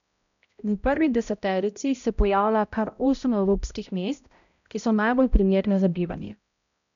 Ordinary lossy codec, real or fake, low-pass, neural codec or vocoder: none; fake; 7.2 kHz; codec, 16 kHz, 0.5 kbps, X-Codec, HuBERT features, trained on balanced general audio